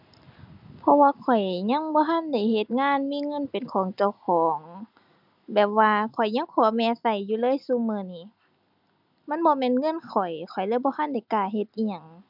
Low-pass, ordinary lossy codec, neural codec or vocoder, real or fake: 5.4 kHz; none; none; real